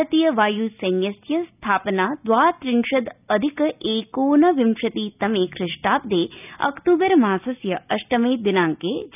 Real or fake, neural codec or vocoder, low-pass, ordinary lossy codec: real; none; 3.6 kHz; none